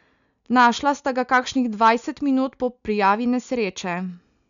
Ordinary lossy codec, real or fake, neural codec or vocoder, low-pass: none; real; none; 7.2 kHz